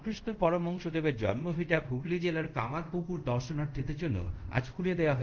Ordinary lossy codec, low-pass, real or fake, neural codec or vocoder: Opus, 32 kbps; 7.2 kHz; fake; codec, 24 kHz, 0.5 kbps, DualCodec